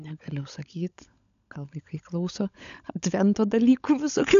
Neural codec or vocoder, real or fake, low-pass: codec, 16 kHz, 16 kbps, FunCodec, trained on LibriTTS, 50 frames a second; fake; 7.2 kHz